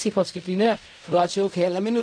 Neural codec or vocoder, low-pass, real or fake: codec, 16 kHz in and 24 kHz out, 0.4 kbps, LongCat-Audio-Codec, fine tuned four codebook decoder; 9.9 kHz; fake